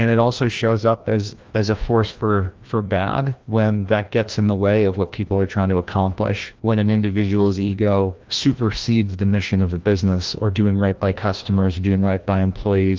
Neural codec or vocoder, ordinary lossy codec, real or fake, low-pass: codec, 16 kHz, 1 kbps, FreqCodec, larger model; Opus, 24 kbps; fake; 7.2 kHz